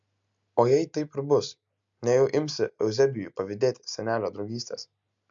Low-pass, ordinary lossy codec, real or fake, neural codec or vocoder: 7.2 kHz; MP3, 64 kbps; real; none